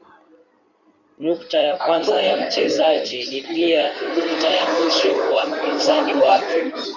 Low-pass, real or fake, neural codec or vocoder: 7.2 kHz; fake; codec, 16 kHz in and 24 kHz out, 2.2 kbps, FireRedTTS-2 codec